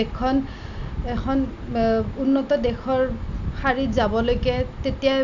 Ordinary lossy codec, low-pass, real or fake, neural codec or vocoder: none; 7.2 kHz; real; none